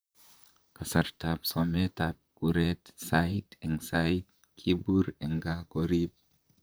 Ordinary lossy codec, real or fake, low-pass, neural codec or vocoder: none; fake; none; vocoder, 44.1 kHz, 128 mel bands, Pupu-Vocoder